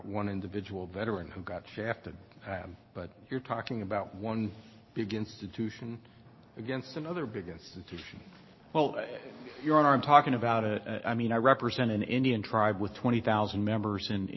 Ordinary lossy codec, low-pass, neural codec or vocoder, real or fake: MP3, 24 kbps; 7.2 kHz; none; real